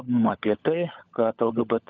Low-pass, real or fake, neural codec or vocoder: 7.2 kHz; fake; codec, 16 kHz, 16 kbps, FunCodec, trained on LibriTTS, 50 frames a second